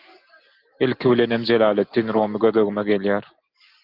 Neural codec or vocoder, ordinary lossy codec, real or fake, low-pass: none; Opus, 32 kbps; real; 5.4 kHz